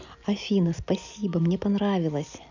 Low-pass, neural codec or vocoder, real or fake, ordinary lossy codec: 7.2 kHz; none; real; none